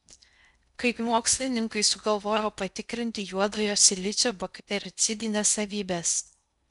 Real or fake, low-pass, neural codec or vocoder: fake; 10.8 kHz; codec, 16 kHz in and 24 kHz out, 0.6 kbps, FocalCodec, streaming, 2048 codes